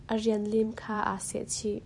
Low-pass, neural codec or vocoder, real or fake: 10.8 kHz; vocoder, 44.1 kHz, 128 mel bands every 256 samples, BigVGAN v2; fake